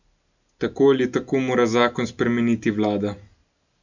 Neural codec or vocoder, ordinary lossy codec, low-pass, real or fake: none; none; 7.2 kHz; real